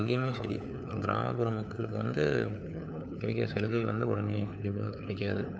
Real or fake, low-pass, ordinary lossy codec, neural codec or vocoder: fake; none; none; codec, 16 kHz, 4 kbps, FunCodec, trained on LibriTTS, 50 frames a second